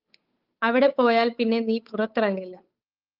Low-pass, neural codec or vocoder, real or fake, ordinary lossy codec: 5.4 kHz; codec, 16 kHz, 8 kbps, FunCodec, trained on Chinese and English, 25 frames a second; fake; Opus, 24 kbps